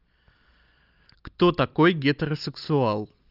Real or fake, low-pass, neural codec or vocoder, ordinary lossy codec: real; 5.4 kHz; none; Opus, 32 kbps